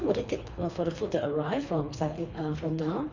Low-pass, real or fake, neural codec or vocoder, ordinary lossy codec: 7.2 kHz; fake; codec, 24 kHz, 3 kbps, HILCodec; none